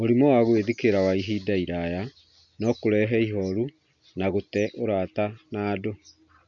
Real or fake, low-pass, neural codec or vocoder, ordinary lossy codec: real; 7.2 kHz; none; none